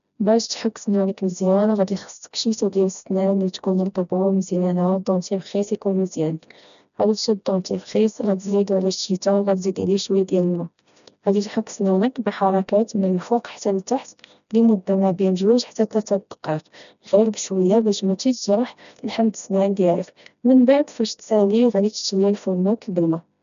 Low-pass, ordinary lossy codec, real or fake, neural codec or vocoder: 7.2 kHz; none; fake; codec, 16 kHz, 1 kbps, FreqCodec, smaller model